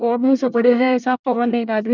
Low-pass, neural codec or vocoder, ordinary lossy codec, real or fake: 7.2 kHz; codec, 24 kHz, 1 kbps, SNAC; none; fake